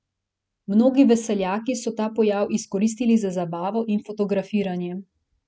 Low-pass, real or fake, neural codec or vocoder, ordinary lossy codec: none; real; none; none